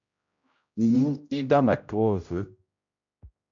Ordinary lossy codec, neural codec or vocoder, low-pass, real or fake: MP3, 64 kbps; codec, 16 kHz, 0.5 kbps, X-Codec, HuBERT features, trained on general audio; 7.2 kHz; fake